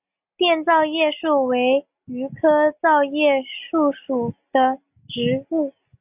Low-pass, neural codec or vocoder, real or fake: 3.6 kHz; none; real